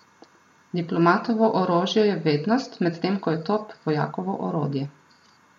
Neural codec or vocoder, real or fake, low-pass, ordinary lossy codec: none; real; 19.8 kHz; MP3, 64 kbps